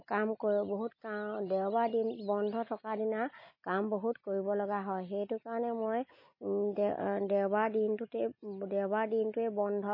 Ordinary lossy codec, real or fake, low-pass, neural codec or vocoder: MP3, 24 kbps; real; 5.4 kHz; none